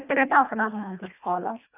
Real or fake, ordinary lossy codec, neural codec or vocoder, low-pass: fake; none; codec, 24 kHz, 1.5 kbps, HILCodec; 3.6 kHz